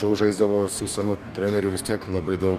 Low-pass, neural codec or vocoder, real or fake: 14.4 kHz; codec, 44.1 kHz, 2.6 kbps, DAC; fake